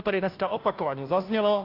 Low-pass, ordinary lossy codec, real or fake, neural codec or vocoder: 5.4 kHz; AAC, 32 kbps; fake; codec, 16 kHz, 0.5 kbps, FunCodec, trained on Chinese and English, 25 frames a second